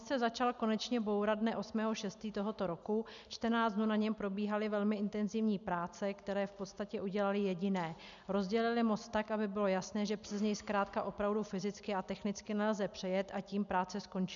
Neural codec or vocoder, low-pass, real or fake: none; 7.2 kHz; real